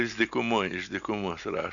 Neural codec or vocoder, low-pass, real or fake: none; 7.2 kHz; real